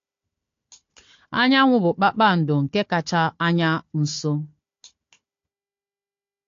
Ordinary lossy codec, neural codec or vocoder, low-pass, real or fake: AAC, 48 kbps; codec, 16 kHz, 4 kbps, FunCodec, trained on Chinese and English, 50 frames a second; 7.2 kHz; fake